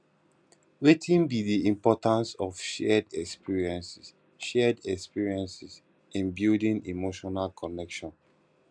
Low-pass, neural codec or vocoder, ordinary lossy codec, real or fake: 9.9 kHz; none; none; real